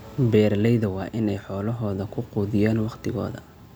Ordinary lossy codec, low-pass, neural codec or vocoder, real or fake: none; none; none; real